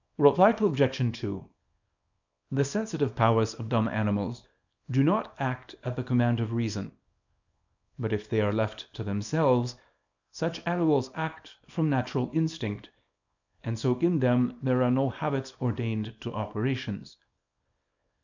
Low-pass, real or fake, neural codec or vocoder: 7.2 kHz; fake; codec, 24 kHz, 0.9 kbps, WavTokenizer, small release